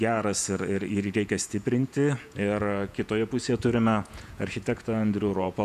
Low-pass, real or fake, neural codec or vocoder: 14.4 kHz; fake; vocoder, 48 kHz, 128 mel bands, Vocos